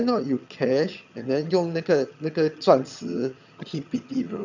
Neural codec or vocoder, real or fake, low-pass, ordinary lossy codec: vocoder, 22.05 kHz, 80 mel bands, HiFi-GAN; fake; 7.2 kHz; none